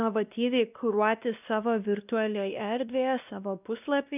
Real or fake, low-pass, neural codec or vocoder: fake; 3.6 kHz; codec, 16 kHz, 1 kbps, X-Codec, WavLM features, trained on Multilingual LibriSpeech